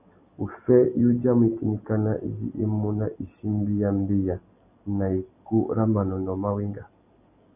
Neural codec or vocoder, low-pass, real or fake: none; 3.6 kHz; real